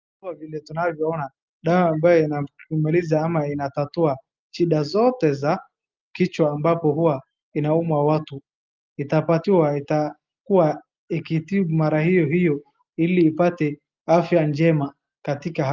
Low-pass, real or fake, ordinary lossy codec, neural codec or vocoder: 7.2 kHz; real; Opus, 24 kbps; none